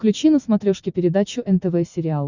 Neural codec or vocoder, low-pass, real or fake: none; 7.2 kHz; real